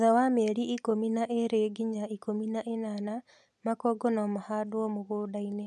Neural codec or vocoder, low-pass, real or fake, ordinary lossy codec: none; none; real; none